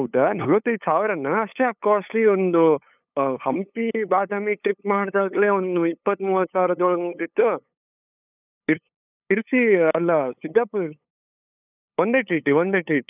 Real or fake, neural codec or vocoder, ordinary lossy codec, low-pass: fake; codec, 16 kHz, 8 kbps, FunCodec, trained on LibriTTS, 25 frames a second; none; 3.6 kHz